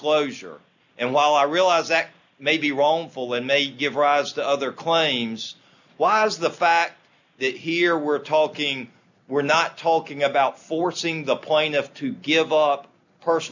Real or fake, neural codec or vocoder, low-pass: real; none; 7.2 kHz